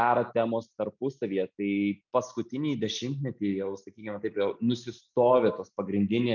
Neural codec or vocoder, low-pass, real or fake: none; 7.2 kHz; real